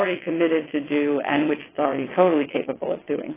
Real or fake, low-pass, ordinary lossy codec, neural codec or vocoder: fake; 3.6 kHz; AAC, 16 kbps; vocoder, 22.05 kHz, 80 mel bands, WaveNeXt